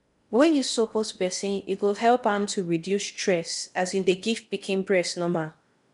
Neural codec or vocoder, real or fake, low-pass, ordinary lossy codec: codec, 16 kHz in and 24 kHz out, 0.6 kbps, FocalCodec, streaming, 4096 codes; fake; 10.8 kHz; none